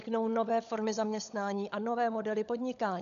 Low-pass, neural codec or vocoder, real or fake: 7.2 kHz; codec, 16 kHz, 16 kbps, FunCodec, trained on LibriTTS, 50 frames a second; fake